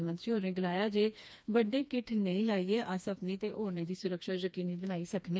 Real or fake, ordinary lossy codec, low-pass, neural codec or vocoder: fake; none; none; codec, 16 kHz, 2 kbps, FreqCodec, smaller model